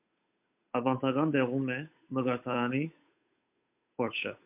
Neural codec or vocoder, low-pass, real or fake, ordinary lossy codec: codec, 16 kHz, 8 kbps, FunCodec, trained on Chinese and English, 25 frames a second; 3.6 kHz; fake; MP3, 32 kbps